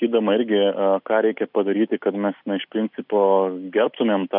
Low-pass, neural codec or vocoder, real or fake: 5.4 kHz; none; real